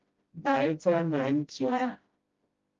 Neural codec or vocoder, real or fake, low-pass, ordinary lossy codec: codec, 16 kHz, 0.5 kbps, FreqCodec, smaller model; fake; 7.2 kHz; Opus, 32 kbps